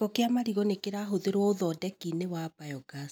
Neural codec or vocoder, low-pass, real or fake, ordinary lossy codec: none; none; real; none